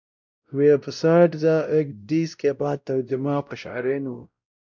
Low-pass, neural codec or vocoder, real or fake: 7.2 kHz; codec, 16 kHz, 0.5 kbps, X-Codec, WavLM features, trained on Multilingual LibriSpeech; fake